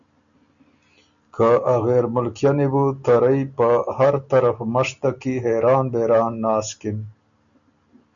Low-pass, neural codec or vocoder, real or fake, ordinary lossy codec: 7.2 kHz; none; real; MP3, 96 kbps